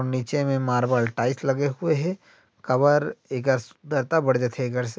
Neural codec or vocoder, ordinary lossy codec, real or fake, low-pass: none; none; real; none